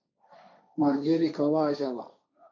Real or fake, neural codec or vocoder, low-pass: fake; codec, 16 kHz, 1.1 kbps, Voila-Tokenizer; 7.2 kHz